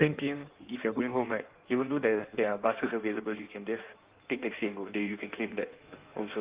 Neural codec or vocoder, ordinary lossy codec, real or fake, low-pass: codec, 16 kHz in and 24 kHz out, 1.1 kbps, FireRedTTS-2 codec; Opus, 32 kbps; fake; 3.6 kHz